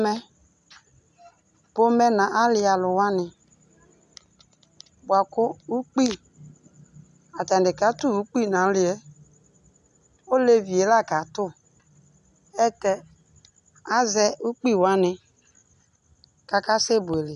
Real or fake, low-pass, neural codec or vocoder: real; 10.8 kHz; none